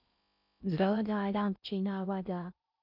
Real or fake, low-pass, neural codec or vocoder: fake; 5.4 kHz; codec, 16 kHz in and 24 kHz out, 0.6 kbps, FocalCodec, streaming, 4096 codes